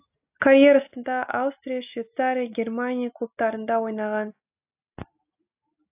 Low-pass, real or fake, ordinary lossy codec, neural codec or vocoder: 3.6 kHz; real; AAC, 32 kbps; none